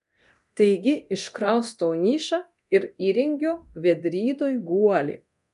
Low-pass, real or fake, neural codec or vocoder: 10.8 kHz; fake; codec, 24 kHz, 0.9 kbps, DualCodec